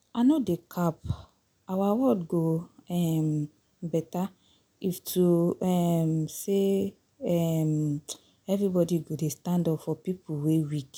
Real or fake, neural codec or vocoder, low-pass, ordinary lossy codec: real; none; none; none